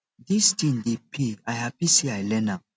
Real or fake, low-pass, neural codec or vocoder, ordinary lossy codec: real; none; none; none